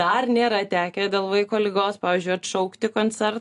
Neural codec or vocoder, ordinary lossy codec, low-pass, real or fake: none; AAC, 64 kbps; 10.8 kHz; real